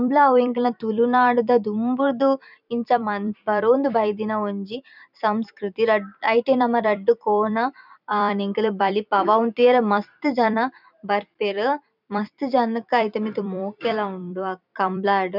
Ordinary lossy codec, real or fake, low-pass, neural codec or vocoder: none; fake; 5.4 kHz; vocoder, 44.1 kHz, 128 mel bands every 256 samples, BigVGAN v2